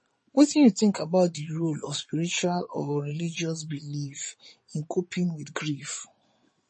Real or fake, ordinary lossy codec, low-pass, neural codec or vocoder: fake; MP3, 32 kbps; 9.9 kHz; vocoder, 22.05 kHz, 80 mel bands, Vocos